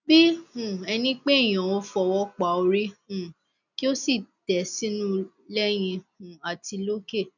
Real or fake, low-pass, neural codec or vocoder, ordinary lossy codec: real; 7.2 kHz; none; none